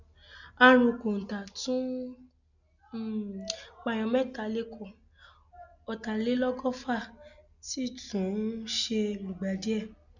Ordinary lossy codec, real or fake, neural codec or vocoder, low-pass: none; real; none; 7.2 kHz